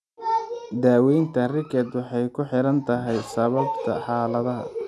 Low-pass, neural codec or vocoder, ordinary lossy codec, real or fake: none; none; none; real